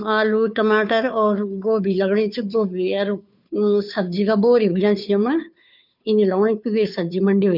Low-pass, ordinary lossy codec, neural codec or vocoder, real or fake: 5.4 kHz; none; codec, 16 kHz, 2 kbps, FunCodec, trained on Chinese and English, 25 frames a second; fake